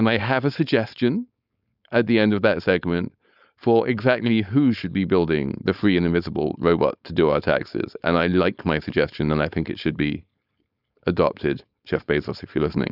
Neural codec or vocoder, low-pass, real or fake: codec, 16 kHz, 4.8 kbps, FACodec; 5.4 kHz; fake